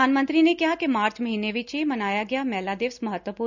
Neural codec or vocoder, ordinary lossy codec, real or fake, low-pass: none; none; real; 7.2 kHz